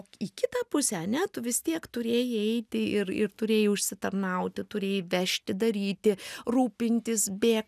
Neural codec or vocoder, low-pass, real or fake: none; 14.4 kHz; real